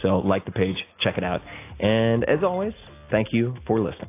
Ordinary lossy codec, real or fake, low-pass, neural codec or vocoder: AAC, 24 kbps; real; 3.6 kHz; none